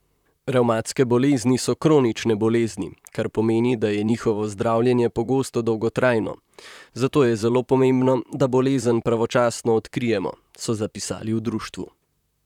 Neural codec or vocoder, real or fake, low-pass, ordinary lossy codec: vocoder, 44.1 kHz, 128 mel bands, Pupu-Vocoder; fake; 19.8 kHz; none